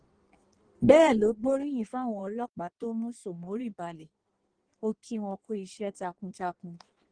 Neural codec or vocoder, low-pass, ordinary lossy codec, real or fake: codec, 16 kHz in and 24 kHz out, 1.1 kbps, FireRedTTS-2 codec; 9.9 kHz; Opus, 16 kbps; fake